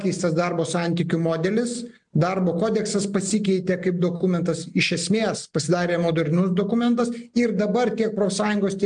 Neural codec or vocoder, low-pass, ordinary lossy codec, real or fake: none; 9.9 kHz; MP3, 64 kbps; real